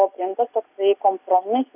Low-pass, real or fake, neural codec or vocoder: 3.6 kHz; real; none